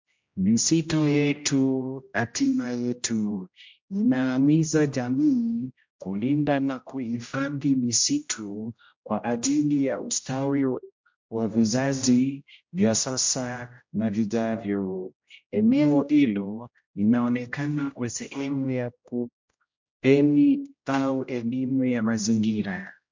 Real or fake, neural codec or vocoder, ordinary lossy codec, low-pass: fake; codec, 16 kHz, 0.5 kbps, X-Codec, HuBERT features, trained on general audio; MP3, 48 kbps; 7.2 kHz